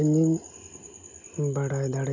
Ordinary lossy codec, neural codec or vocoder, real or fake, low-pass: none; none; real; 7.2 kHz